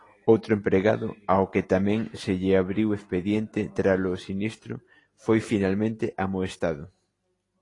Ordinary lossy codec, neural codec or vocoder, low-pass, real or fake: AAC, 32 kbps; none; 10.8 kHz; real